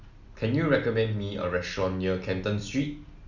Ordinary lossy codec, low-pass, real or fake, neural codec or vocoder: none; 7.2 kHz; real; none